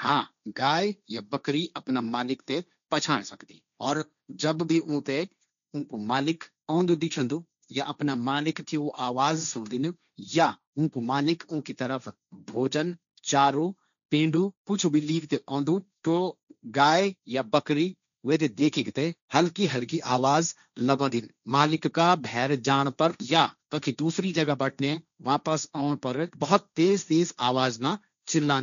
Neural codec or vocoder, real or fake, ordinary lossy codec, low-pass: codec, 16 kHz, 1.1 kbps, Voila-Tokenizer; fake; none; 7.2 kHz